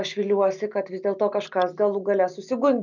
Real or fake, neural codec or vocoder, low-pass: real; none; 7.2 kHz